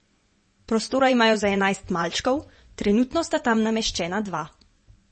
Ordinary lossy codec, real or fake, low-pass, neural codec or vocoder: MP3, 32 kbps; fake; 10.8 kHz; vocoder, 24 kHz, 100 mel bands, Vocos